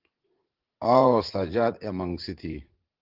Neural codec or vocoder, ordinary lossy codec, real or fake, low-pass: vocoder, 44.1 kHz, 80 mel bands, Vocos; Opus, 16 kbps; fake; 5.4 kHz